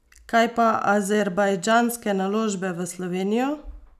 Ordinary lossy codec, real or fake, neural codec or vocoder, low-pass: none; real; none; 14.4 kHz